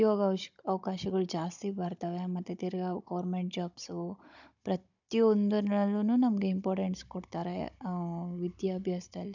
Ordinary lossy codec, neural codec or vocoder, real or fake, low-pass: none; codec, 16 kHz, 16 kbps, FunCodec, trained on Chinese and English, 50 frames a second; fake; 7.2 kHz